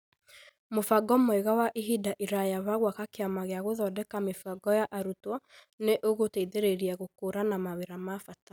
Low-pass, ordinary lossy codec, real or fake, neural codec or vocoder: none; none; real; none